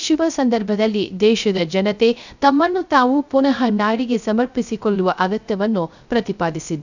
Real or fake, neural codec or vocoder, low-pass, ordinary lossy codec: fake; codec, 16 kHz, 0.3 kbps, FocalCodec; 7.2 kHz; none